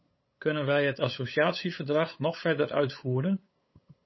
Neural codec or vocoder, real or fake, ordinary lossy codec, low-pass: codec, 16 kHz, 8 kbps, FunCodec, trained on LibriTTS, 25 frames a second; fake; MP3, 24 kbps; 7.2 kHz